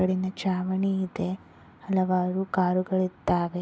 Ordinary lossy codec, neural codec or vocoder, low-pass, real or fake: none; none; none; real